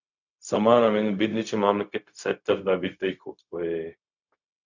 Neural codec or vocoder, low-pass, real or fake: codec, 16 kHz, 0.4 kbps, LongCat-Audio-Codec; 7.2 kHz; fake